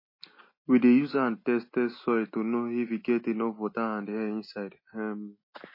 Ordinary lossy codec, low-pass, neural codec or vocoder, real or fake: MP3, 24 kbps; 5.4 kHz; none; real